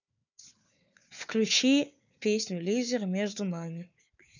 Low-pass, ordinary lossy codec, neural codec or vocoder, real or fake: 7.2 kHz; none; codec, 16 kHz, 4 kbps, FunCodec, trained on Chinese and English, 50 frames a second; fake